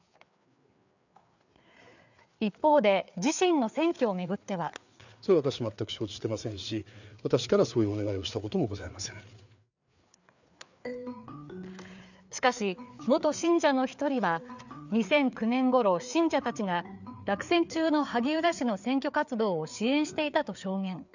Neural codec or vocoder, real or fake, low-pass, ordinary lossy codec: codec, 16 kHz, 4 kbps, FreqCodec, larger model; fake; 7.2 kHz; none